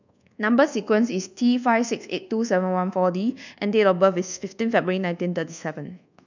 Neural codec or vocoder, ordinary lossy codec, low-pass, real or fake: codec, 24 kHz, 1.2 kbps, DualCodec; none; 7.2 kHz; fake